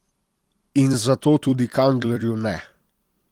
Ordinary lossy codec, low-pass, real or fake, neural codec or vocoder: Opus, 24 kbps; 19.8 kHz; fake; vocoder, 44.1 kHz, 128 mel bands every 256 samples, BigVGAN v2